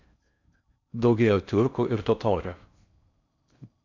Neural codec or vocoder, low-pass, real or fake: codec, 16 kHz in and 24 kHz out, 0.6 kbps, FocalCodec, streaming, 4096 codes; 7.2 kHz; fake